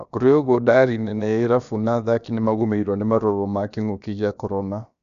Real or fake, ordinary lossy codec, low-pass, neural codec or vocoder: fake; none; 7.2 kHz; codec, 16 kHz, about 1 kbps, DyCAST, with the encoder's durations